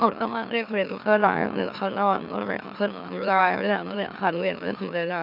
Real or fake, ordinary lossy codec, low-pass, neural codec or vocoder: fake; none; 5.4 kHz; autoencoder, 44.1 kHz, a latent of 192 numbers a frame, MeloTTS